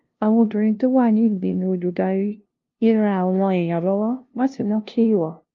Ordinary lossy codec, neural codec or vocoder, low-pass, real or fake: Opus, 32 kbps; codec, 16 kHz, 0.5 kbps, FunCodec, trained on LibriTTS, 25 frames a second; 7.2 kHz; fake